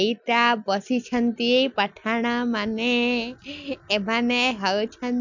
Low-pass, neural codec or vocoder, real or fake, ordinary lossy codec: 7.2 kHz; none; real; none